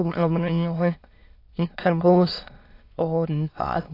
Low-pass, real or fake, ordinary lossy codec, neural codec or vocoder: 5.4 kHz; fake; AAC, 32 kbps; autoencoder, 22.05 kHz, a latent of 192 numbers a frame, VITS, trained on many speakers